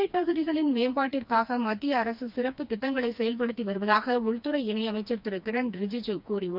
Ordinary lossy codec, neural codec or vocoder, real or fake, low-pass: none; codec, 16 kHz, 2 kbps, FreqCodec, smaller model; fake; 5.4 kHz